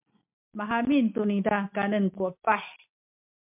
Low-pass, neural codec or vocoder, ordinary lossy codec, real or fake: 3.6 kHz; none; MP3, 32 kbps; real